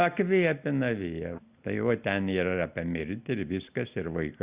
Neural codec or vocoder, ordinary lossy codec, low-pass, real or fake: none; Opus, 64 kbps; 3.6 kHz; real